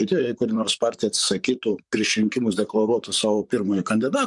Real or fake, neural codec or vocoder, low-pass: fake; vocoder, 44.1 kHz, 128 mel bands, Pupu-Vocoder; 10.8 kHz